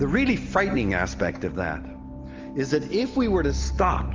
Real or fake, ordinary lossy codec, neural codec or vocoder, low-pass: real; Opus, 32 kbps; none; 7.2 kHz